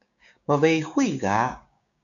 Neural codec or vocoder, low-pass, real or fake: codec, 16 kHz, 6 kbps, DAC; 7.2 kHz; fake